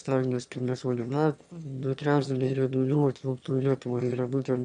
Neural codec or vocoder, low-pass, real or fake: autoencoder, 22.05 kHz, a latent of 192 numbers a frame, VITS, trained on one speaker; 9.9 kHz; fake